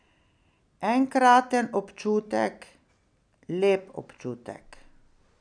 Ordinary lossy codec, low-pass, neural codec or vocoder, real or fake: none; 9.9 kHz; none; real